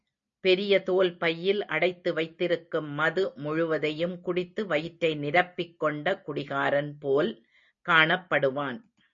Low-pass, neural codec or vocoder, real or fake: 7.2 kHz; none; real